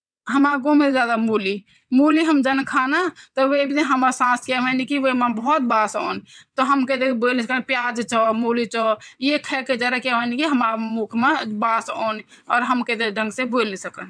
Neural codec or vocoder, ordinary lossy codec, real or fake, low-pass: vocoder, 22.05 kHz, 80 mel bands, WaveNeXt; none; fake; 9.9 kHz